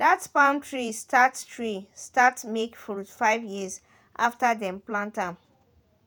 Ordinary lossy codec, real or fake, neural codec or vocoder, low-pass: none; fake; vocoder, 48 kHz, 128 mel bands, Vocos; none